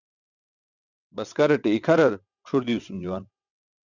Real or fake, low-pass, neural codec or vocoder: fake; 7.2 kHz; vocoder, 22.05 kHz, 80 mel bands, WaveNeXt